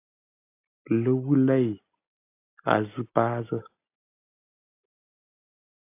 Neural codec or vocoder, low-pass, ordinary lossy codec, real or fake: none; 3.6 kHz; AAC, 24 kbps; real